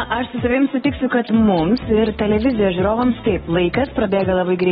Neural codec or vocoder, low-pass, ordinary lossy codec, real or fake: none; 19.8 kHz; AAC, 16 kbps; real